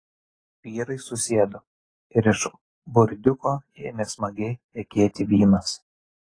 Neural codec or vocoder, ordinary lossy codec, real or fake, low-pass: none; AAC, 32 kbps; real; 9.9 kHz